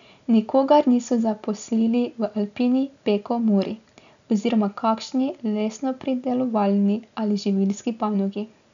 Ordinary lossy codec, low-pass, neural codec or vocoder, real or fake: none; 7.2 kHz; none; real